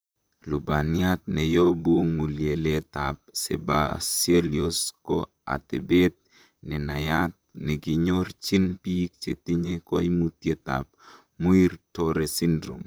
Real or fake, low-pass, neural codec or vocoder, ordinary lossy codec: fake; none; vocoder, 44.1 kHz, 128 mel bands, Pupu-Vocoder; none